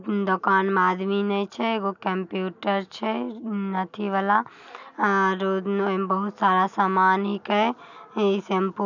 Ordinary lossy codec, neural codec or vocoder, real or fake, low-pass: none; none; real; 7.2 kHz